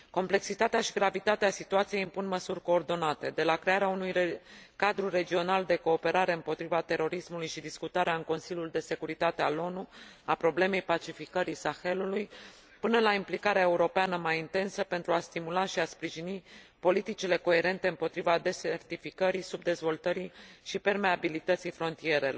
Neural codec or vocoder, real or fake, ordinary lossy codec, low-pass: none; real; none; none